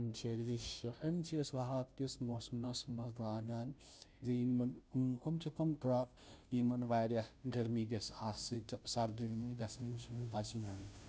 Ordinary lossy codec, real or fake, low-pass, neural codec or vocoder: none; fake; none; codec, 16 kHz, 0.5 kbps, FunCodec, trained on Chinese and English, 25 frames a second